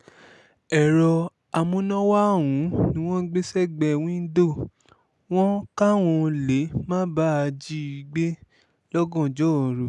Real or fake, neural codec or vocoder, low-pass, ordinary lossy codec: real; none; none; none